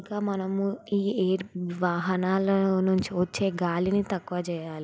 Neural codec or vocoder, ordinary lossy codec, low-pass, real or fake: none; none; none; real